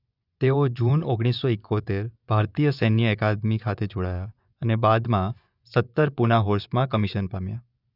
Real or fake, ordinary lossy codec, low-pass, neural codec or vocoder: fake; none; 5.4 kHz; vocoder, 24 kHz, 100 mel bands, Vocos